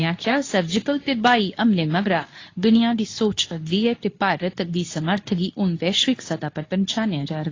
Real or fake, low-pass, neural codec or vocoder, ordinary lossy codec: fake; 7.2 kHz; codec, 24 kHz, 0.9 kbps, WavTokenizer, medium speech release version 2; AAC, 32 kbps